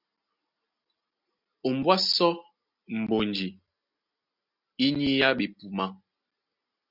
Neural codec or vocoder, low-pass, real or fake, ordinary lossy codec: none; 5.4 kHz; real; Opus, 64 kbps